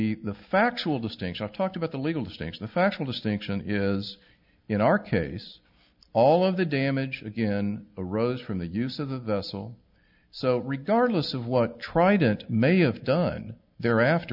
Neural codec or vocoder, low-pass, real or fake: none; 5.4 kHz; real